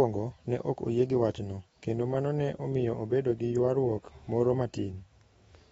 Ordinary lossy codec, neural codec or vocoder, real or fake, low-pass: AAC, 24 kbps; autoencoder, 48 kHz, 128 numbers a frame, DAC-VAE, trained on Japanese speech; fake; 19.8 kHz